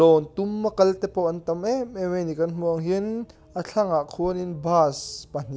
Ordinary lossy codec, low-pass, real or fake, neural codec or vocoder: none; none; real; none